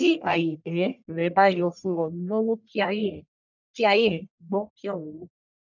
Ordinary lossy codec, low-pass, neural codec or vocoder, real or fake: none; 7.2 kHz; codec, 44.1 kHz, 1.7 kbps, Pupu-Codec; fake